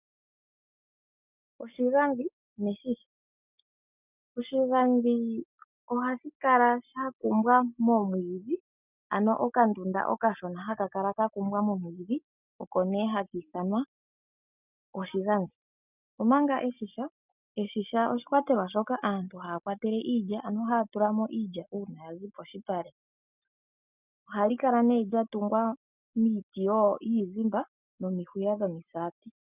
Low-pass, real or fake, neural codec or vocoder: 3.6 kHz; real; none